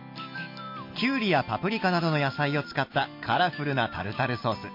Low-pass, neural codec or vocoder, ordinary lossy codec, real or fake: 5.4 kHz; none; MP3, 32 kbps; real